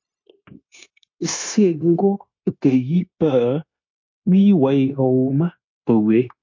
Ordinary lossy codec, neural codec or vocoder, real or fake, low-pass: MP3, 48 kbps; codec, 16 kHz, 0.9 kbps, LongCat-Audio-Codec; fake; 7.2 kHz